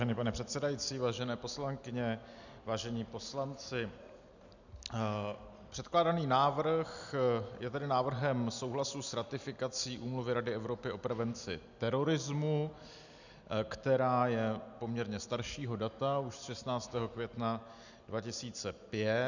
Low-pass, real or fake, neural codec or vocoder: 7.2 kHz; real; none